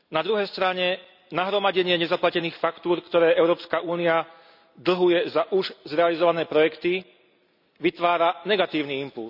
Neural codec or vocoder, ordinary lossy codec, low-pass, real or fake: none; none; 5.4 kHz; real